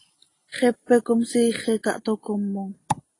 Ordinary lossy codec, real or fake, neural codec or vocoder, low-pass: AAC, 32 kbps; real; none; 10.8 kHz